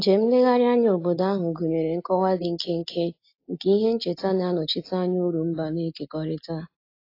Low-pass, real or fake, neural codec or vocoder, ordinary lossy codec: 5.4 kHz; real; none; AAC, 32 kbps